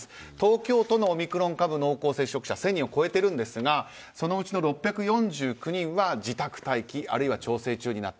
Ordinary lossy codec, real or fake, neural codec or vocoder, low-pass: none; real; none; none